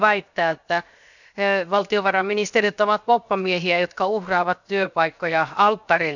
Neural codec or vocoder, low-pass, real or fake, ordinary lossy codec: codec, 16 kHz, 0.7 kbps, FocalCodec; 7.2 kHz; fake; none